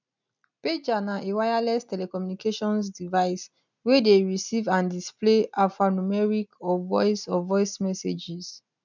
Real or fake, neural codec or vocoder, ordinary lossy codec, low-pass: real; none; none; 7.2 kHz